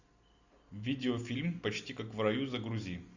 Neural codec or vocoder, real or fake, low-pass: none; real; 7.2 kHz